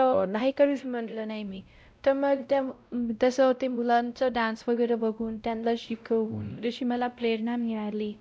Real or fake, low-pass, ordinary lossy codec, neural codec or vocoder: fake; none; none; codec, 16 kHz, 0.5 kbps, X-Codec, WavLM features, trained on Multilingual LibriSpeech